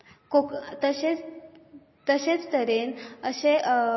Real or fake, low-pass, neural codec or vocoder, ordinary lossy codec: real; 7.2 kHz; none; MP3, 24 kbps